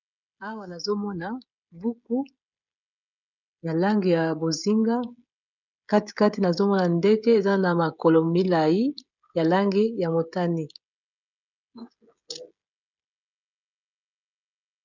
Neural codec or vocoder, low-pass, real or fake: codec, 16 kHz, 16 kbps, FreqCodec, smaller model; 7.2 kHz; fake